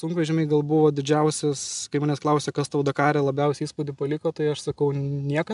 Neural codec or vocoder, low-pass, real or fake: none; 10.8 kHz; real